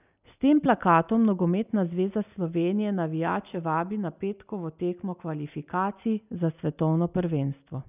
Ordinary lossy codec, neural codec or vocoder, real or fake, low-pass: none; none; real; 3.6 kHz